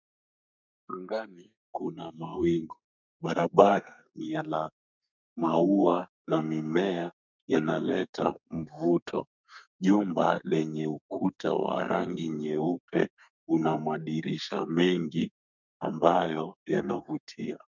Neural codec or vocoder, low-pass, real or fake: codec, 32 kHz, 1.9 kbps, SNAC; 7.2 kHz; fake